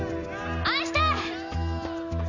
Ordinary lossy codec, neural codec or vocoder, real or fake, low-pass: none; none; real; 7.2 kHz